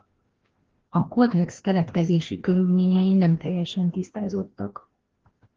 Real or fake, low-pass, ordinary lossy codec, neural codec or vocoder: fake; 7.2 kHz; Opus, 16 kbps; codec, 16 kHz, 1 kbps, FreqCodec, larger model